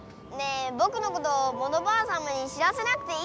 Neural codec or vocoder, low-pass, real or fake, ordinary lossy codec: none; none; real; none